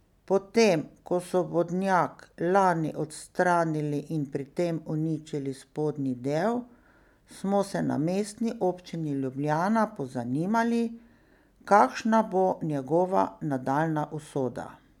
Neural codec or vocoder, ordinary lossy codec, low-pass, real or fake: none; none; 19.8 kHz; real